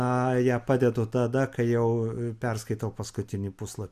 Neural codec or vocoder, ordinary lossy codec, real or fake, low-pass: none; MP3, 96 kbps; real; 14.4 kHz